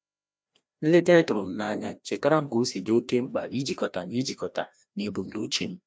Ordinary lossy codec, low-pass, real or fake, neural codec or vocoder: none; none; fake; codec, 16 kHz, 1 kbps, FreqCodec, larger model